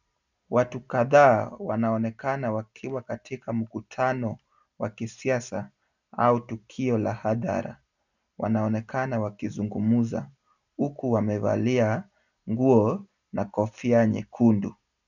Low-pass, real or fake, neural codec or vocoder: 7.2 kHz; real; none